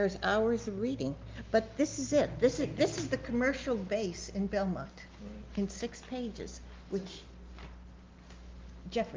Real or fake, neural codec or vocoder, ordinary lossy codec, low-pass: real; none; Opus, 32 kbps; 7.2 kHz